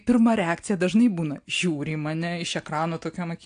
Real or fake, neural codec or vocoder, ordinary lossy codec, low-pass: real; none; AAC, 64 kbps; 9.9 kHz